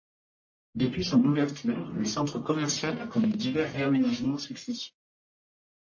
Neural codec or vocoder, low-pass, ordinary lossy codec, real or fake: codec, 44.1 kHz, 1.7 kbps, Pupu-Codec; 7.2 kHz; MP3, 32 kbps; fake